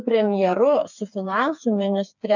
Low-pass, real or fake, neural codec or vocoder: 7.2 kHz; fake; codec, 16 kHz, 4 kbps, FreqCodec, smaller model